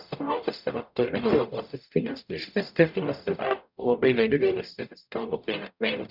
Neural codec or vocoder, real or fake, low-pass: codec, 44.1 kHz, 0.9 kbps, DAC; fake; 5.4 kHz